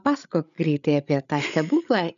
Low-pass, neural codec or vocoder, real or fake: 7.2 kHz; codec, 16 kHz, 8 kbps, FreqCodec, larger model; fake